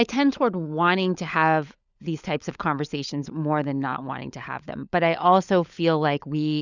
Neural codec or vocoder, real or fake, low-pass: codec, 16 kHz, 16 kbps, FunCodec, trained on LibriTTS, 50 frames a second; fake; 7.2 kHz